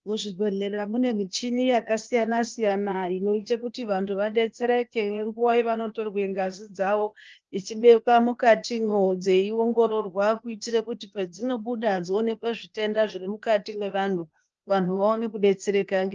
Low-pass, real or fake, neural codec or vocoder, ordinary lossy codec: 7.2 kHz; fake; codec, 16 kHz, 0.8 kbps, ZipCodec; Opus, 24 kbps